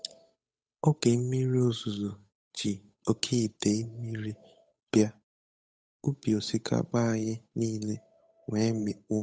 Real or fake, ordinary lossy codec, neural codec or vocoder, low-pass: fake; none; codec, 16 kHz, 8 kbps, FunCodec, trained on Chinese and English, 25 frames a second; none